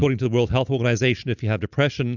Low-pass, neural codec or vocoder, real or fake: 7.2 kHz; none; real